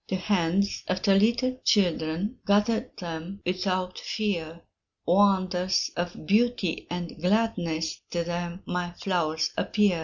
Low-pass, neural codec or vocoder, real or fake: 7.2 kHz; none; real